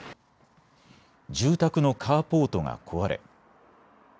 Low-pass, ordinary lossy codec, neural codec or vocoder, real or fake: none; none; none; real